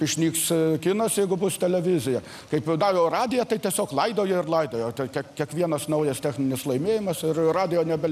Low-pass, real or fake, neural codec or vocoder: 14.4 kHz; real; none